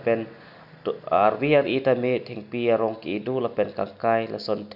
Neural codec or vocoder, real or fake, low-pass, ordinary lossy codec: none; real; 5.4 kHz; none